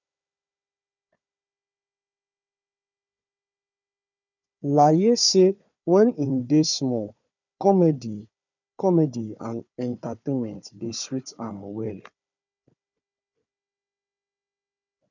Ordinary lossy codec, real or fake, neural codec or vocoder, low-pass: none; fake; codec, 16 kHz, 4 kbps, FunCodec, trained on Chinese and English, 50 frames a second; 7.2 kHz